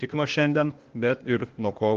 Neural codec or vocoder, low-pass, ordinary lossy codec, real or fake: codec, 16 kHz, 0.8 kbps, ZipCodec; 7.2 kHz; Opus, 16 kbps; fake